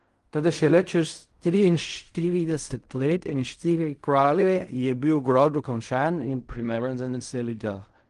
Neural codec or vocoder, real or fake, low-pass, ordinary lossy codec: codec, 16 kHz in and 24 kHz out, 0.4 kbps, LongCat-Audio-Codec, fine tuned four codebook decoder; fake; 10.8 kHz; Opus, 24 kbps